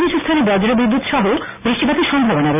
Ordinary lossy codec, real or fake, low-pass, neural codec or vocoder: AAC, 32 kbps; real; 3.6 kHz; none